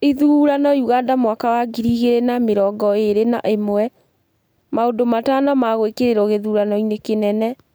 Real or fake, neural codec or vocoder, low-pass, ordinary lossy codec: real; none; none; none